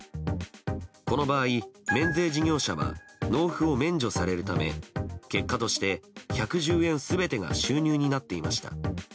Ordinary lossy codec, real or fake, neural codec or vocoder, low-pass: none; real; none; none